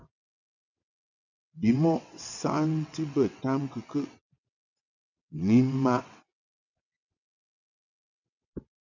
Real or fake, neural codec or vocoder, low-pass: fake; vocoder, 22.05 kHz, 80 mel bands, WaveNeXt; 7.2 kHz